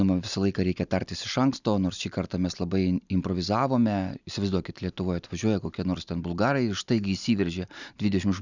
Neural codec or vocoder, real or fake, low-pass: none; real; 7.2 kHz